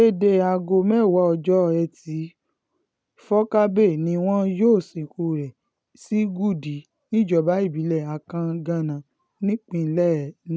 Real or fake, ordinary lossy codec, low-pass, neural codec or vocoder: real; none; none; none